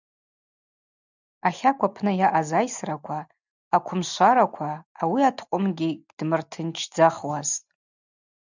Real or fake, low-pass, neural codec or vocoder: real; 7.2 kHz; none